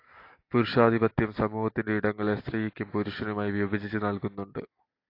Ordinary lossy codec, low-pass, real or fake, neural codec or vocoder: AAC, 32 kbps; 5.4 kHz; real; none